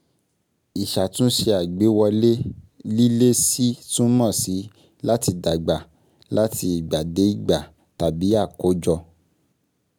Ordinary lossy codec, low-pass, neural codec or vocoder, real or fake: none; none; none; real